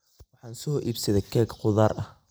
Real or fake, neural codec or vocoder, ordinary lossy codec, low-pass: fake; vocoder, 44.1 kHz, 128 mel bands every 256 samples, BigVGAN v2; none; none